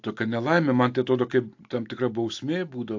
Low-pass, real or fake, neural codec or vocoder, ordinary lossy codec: 7.2 kHz; real; none; AAC, 48 kbps